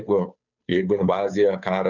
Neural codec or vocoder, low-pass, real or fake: codec, 16 kHz, 2 kbps, FunCodec, trained on Chinese and English, 25 frames a second; 7.2 kHz; fake